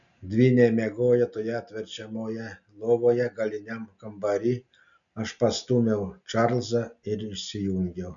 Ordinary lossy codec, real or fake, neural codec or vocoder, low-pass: MP3, 96 kbps; real; none; 7.2 kHz